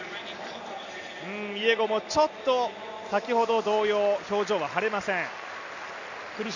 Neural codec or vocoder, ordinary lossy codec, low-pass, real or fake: none; none; 7.2 kHz; real